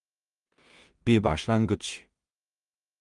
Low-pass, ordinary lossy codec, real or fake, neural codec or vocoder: 10.8 kHz; Opus, 32 kbps; fake; codec, 16 kHz in and 24 kHz out, 0.4 kbps, LongCat-Audio-Codec, two codebook decoder